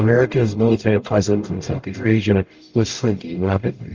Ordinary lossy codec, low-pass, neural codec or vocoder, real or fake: Opus, 24 kbps; 7.2 kHz; codec, 44.1 kHz, 0.9 kbps, DAC; fake